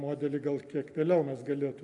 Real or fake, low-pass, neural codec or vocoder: real; 10.8 kHz; none